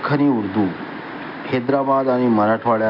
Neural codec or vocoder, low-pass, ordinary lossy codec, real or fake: none; 5.4 kHz; none; real